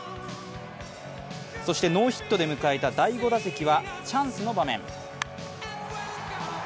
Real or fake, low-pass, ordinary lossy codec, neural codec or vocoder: real; none; none; none